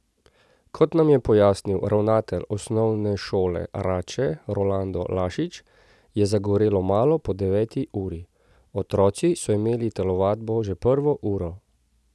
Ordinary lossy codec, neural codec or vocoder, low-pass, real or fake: none; none; none; real